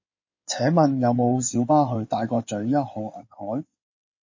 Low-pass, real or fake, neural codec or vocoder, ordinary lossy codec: 7.2 kHz; fake; codec, 16 kHz in and 24 kHz out, 2.2 kbps, FireRedTTS-2 codec; MP3, 32 kbps